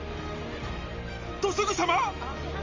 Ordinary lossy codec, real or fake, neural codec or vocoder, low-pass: Opus, 32 kbps; real; none; 7.2 kHz